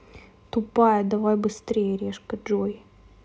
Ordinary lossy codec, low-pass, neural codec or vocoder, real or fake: none; none; none; real